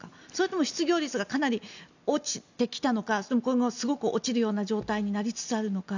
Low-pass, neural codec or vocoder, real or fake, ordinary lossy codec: 7.2 kHz; none; real; none